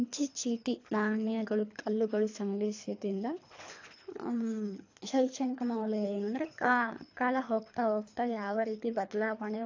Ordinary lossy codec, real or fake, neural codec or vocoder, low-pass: AAC, 48 kbps; fake; codec, 24 kHz, 3 kbps, HILCodec; 7.2 kHz